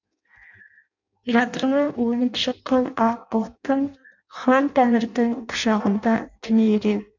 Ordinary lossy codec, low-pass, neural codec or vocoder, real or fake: none; 7.2 kHz; codec, 16 kHz in and 24 kHz out, 0.6 kbps, FireRedTTS-2 codec; fake